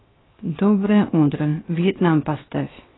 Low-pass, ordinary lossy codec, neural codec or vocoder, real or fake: 7.2 kHz; AAC, 16 kbps; codec, 16 kHz, 0.7 kbps, FocalCodec; fake